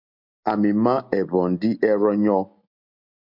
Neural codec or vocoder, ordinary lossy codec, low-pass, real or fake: none; MP3, 48 kbps; 5.4 kHz; real